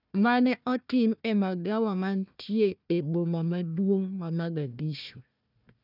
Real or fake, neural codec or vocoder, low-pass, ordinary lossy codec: fake; codec, 16 kHz, 1 kbps, FunCodec, trained on Chinese and English, 50 frames a second; 5.4 kHz; none